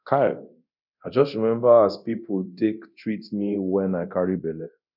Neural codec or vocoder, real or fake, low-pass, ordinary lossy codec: codec, 24 kHz, 0.9 kbps, DualCodec; fake; 5.4 kHz; none